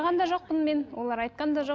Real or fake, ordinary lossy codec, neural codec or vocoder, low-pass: real; none; none; none